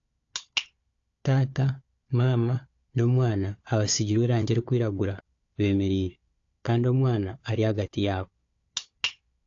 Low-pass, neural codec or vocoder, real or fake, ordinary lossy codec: 7.2 kHz; codec, 16 kHz, 4 kbps, FunCodec, trained on Chinese and English, 50 frames a second; fake; AAC, 48 kbps